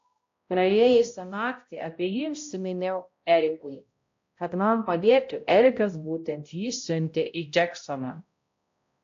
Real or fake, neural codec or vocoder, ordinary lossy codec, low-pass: fake; codec, 16 kHz, 0.5 kbps, X-Codec, HuBERT features, trained on balanced general audio; AAC, 48 kbps; 7.2 kHz